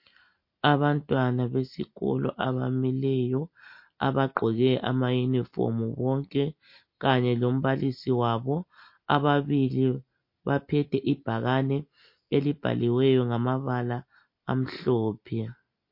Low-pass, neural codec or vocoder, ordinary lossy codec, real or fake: 5.4 kHz; none; MP3, 32 kbps; real